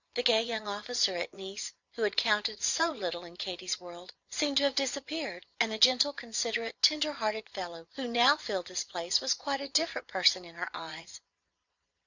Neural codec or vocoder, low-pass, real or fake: vocoder, 22.05 kHz, 80 mel bands, Vocos; 7.2 kHz; fake